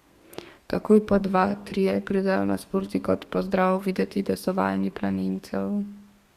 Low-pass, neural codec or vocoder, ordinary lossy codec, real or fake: 14.4 kHz; codec, 32 kHz, 1.9 kbps, SNAC; Opus, 64 kbps; fake